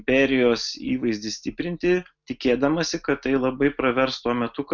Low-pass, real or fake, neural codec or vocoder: 7.2 kHz; real; none